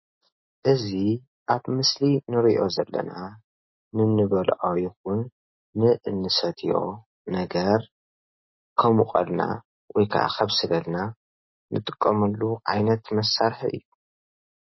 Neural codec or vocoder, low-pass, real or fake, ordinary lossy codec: none; 7.2 kHz; real; MP3, 24 kbps